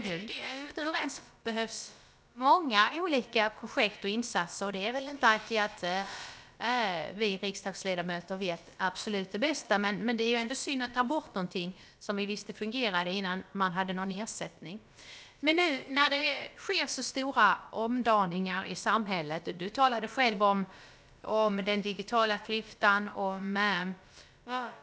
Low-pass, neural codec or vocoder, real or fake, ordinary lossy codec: none; codec, 16 kHz, about 1 kbps, DyCAST, with the encoder's durations; fake; none